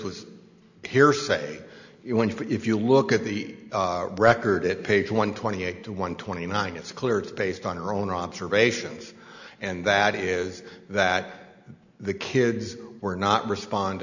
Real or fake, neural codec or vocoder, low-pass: real; none; 7.2 kHz